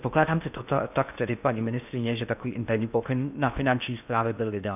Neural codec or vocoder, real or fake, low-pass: codec, 16 kHz in and 24 kHz out, 0.8 kbps, FocalCodec, streaming, 65536 codes; fake; 3.6 kHz